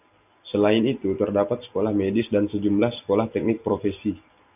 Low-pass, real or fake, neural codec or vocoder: 3.6 kHz; real; none